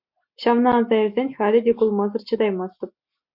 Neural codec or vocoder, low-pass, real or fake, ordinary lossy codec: none; 5.4 kHz; real; AAC, 48 kbps